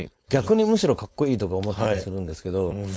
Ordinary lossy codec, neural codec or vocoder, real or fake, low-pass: none; codec, 16 kHz, 4.8 kbps, FACodec; fake; none